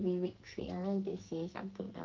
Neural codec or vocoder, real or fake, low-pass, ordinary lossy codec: codec, 44.1 kHz, 2.6 kbps, SNAC; fake; 7.2 kHz; Opus, 24 kbps